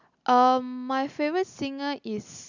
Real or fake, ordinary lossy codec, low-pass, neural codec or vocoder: real; none; 7.2 kHz; none